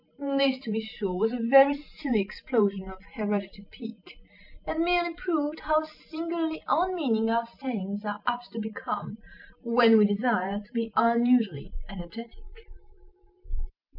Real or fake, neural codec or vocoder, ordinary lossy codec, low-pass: fake; vocoder, 44.1 kHz, 128 mel bands every 512 samples, BigVGAN v2; MP3, 48 kbps; 5.4 kHz